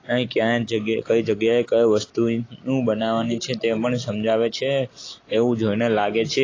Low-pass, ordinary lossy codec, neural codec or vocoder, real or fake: 7.2 kHz; AAC, 32 kbps; none; real